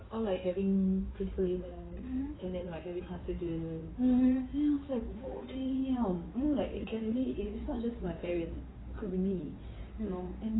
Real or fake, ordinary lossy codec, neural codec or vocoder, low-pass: fake; AAC, 16 kbps; codec, 16 kHz in and 24 kHz out, 2.2 kbps, FireRedTTS-2 codec; 7.2 kHz